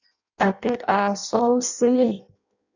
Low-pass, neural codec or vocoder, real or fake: 7.2 kHz; codec, 16 kHz in and 24 kHz out, 0.6 kbps, FireRedTTS-2 codec; fake